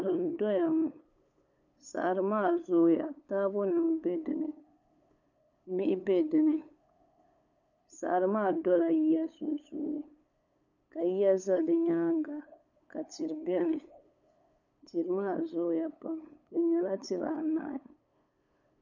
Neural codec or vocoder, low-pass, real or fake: codec, 16 kHz, 8 kbps, FunCodec, trained on LibriTTS, 25 frames a second; 7.2 kHz; fake